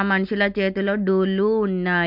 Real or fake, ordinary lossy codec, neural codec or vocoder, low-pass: real; MP3, 48 kbps; none; 5.4 kHz